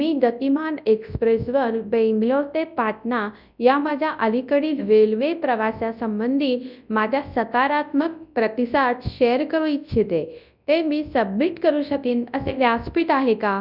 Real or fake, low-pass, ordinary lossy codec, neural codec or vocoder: fake; 5.4 kHz; none; codec, 24 kHz, 0.9 kbps, WavTokenizer, large speech release